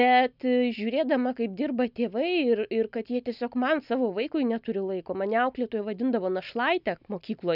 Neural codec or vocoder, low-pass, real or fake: none; 5.4 kHz; real